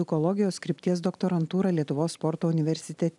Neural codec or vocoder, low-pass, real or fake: none; 10.8 kHz; real